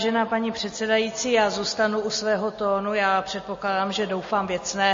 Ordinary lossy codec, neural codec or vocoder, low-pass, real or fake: MP3, 32 kbps; none; 7.2 kHz; real